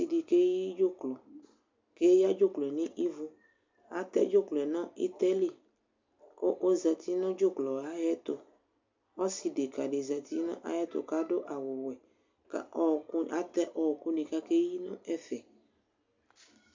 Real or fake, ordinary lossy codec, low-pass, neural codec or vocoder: real; MP3, 64 kbps; 7.2 kHz; none